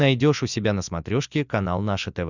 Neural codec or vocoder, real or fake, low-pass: none; real; 7.2 kHz